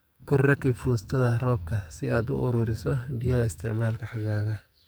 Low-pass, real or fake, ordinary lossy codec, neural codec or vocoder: none; fake; none; codec, 44.1 kHz, 2.6 kbps, SNAC